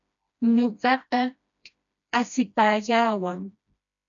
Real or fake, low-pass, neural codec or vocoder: fake; 7.2 kHz; codec, 16 kHz, 1 kbps, FreqCodec, smaller model